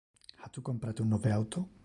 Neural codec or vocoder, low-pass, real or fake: none; 10.8 kHz; real